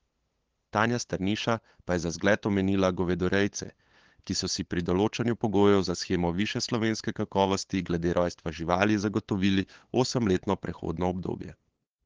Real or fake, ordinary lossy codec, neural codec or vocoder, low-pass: fake; Opus, 16 kbps; codec, 16 kHz, 8 kbps, FunCodec, trained on LibriTTS, 25 frames a second; 7.2 kHz